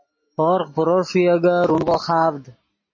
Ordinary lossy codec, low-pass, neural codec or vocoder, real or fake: MP3, 32 kbps; 7.2 kHz; none; real